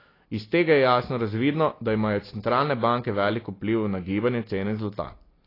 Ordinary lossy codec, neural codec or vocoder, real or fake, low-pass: AAC, 24 kbps; none; real; 5.4 kHz